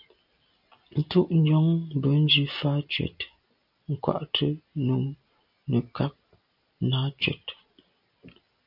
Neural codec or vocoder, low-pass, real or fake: none; 5.4 kHz; real